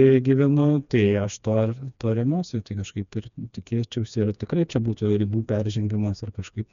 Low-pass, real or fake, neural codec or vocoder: 7.2 kHz; fake; codec, 16 kHz, 2 kbps, FreqCodec, smaller model